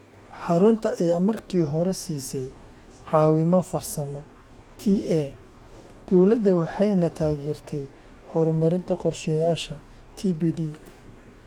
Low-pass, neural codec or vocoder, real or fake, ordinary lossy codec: 19.8 kHz; codec, 44.1 kHz, 2.6 kbps, DAC; fake; none